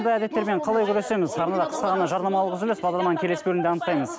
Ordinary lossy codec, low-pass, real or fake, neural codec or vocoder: none; none; real; none